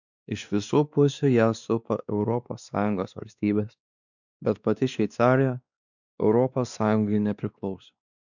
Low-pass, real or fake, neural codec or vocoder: 7.2 kHz; fake; codec, 16 kHz, 2 kbps, X-Codec, WavLM features, trained on Multilingual LibriSpeech